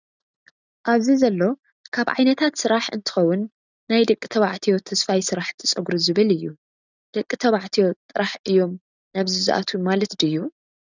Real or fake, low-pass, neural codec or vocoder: real; 7.2 kHz; none